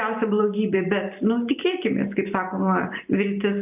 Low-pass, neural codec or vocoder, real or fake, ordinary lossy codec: 3.6 kHz; none; real; AAC, 32 kbps